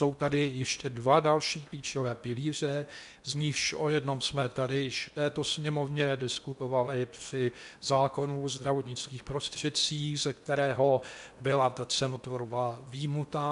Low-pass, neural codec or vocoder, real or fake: 10.8 kHz; codec, 16 kHz in and 24 kHz out, 0.8 kbps, FocalCodec, streaming, 65536 codes; fake